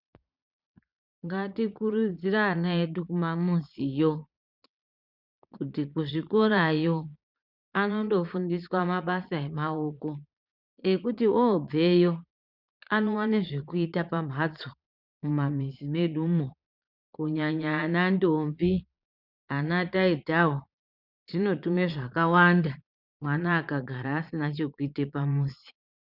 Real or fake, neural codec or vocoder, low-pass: fake; vocoder, 22.05 kHz, 80 mel bands, Vocos; 5.4 kHz